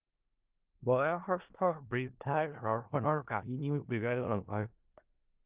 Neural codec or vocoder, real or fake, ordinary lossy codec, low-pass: codec, 16 kHz in and 24 kHz out, 0.4 kbps, LongCat-Audio-Codec, four codebook decoder; fake; Opus, 32 kbps; 3.6 kHz